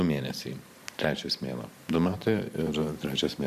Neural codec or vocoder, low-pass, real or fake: vocoder, 44.1 kHz, 128 mel bands every 512 samples, BigVGAN v2; 14.4 kHz; fake